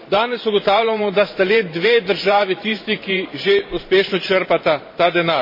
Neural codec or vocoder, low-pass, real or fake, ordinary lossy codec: vocoder, 44.1 kHz, 128 mel bands every 512 samples, BigVGAN v2; 5.4 kHz; fake; MP3, 24 kbps